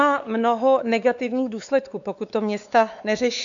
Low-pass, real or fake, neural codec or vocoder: 7.2 kHz; fake; codec, 16 kHz, 4 kbps, X-Codec, WavLM features, trained on Multilingual LibriSpeech